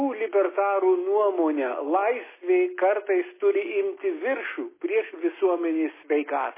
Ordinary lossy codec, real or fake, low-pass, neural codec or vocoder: MP3, 16 kbps; real; 3.6 kHz; none